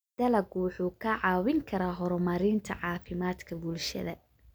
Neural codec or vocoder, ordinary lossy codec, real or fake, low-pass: none; none; real; none